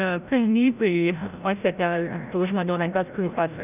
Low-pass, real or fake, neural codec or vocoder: 3.6 kHz; fake; codec, 16 kHz, 0.5 kbps, FreqCodec, larger model